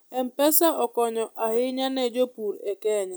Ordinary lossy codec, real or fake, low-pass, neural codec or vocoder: none; real; none; none